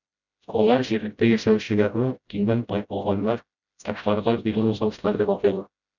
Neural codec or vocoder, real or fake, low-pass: codec, 16 kHz, 0.5 kbps, FreqCodec, smaller model; fake; 7.2 kHz